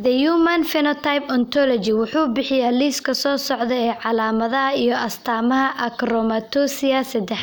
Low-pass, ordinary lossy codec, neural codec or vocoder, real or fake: none; none; none; real